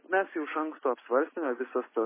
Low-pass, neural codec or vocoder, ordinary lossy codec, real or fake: 3.6 kHz; none; MP3, 16 kbps; real